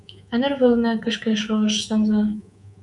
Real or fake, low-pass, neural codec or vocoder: fake; 10.8 kHz; codec, 24 kHz, 3.1 kbps, DualCodec